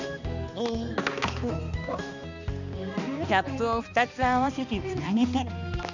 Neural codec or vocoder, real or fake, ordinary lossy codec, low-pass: codec, 16 kHz, 2 kbps, X-Codec, HuBERT features, trained on balanced general audio; fake; none; 7.2 kHz